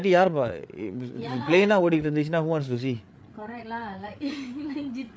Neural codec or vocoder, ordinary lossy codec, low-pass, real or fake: codec, 16 kHz, 8 kbps, FreqCodec, larger model; none; none; fake